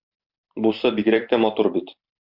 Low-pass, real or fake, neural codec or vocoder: 5.4 kHz; fake; vocoder, 44.1 kHz, 128 mel bands every 256 samples, BigVGAN v2